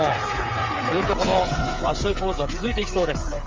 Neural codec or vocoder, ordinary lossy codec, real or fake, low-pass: codec, 16 kHz, 8 kbps, FreqCodec, smaller model; Opus, 32 kbps; fake; 7.2 kHz